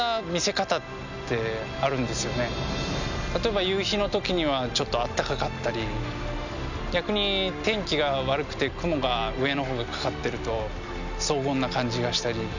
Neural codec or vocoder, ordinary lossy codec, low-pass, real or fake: none; none; 7.2 kHz; real